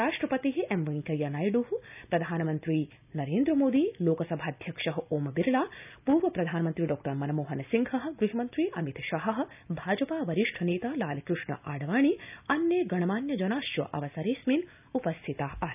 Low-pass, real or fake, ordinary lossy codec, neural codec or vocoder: 3.6 kHz; real; none; none